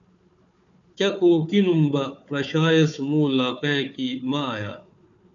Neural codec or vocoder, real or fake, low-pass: codec, 16 kHz, 4 kbps, FunCodec, trained on Chinese and English, 50 frames a second; fake; 7.2 kHz